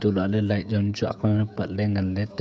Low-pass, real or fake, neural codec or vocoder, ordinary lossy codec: none; fake; codec, 16 kHz, 16 kbps, FreqCodec, smaller model; none